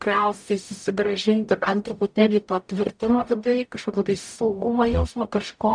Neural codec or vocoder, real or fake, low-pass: codec, 44.1 kHz, 0.9 kbps, DAC; fake; 9.9 kHz